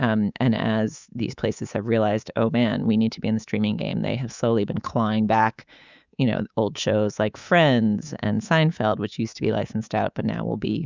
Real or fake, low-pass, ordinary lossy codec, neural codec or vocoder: fake; 7.2 kHz; Opus, 64 kbps; codec, 24 kHz, 3.1 kbps, DualCodec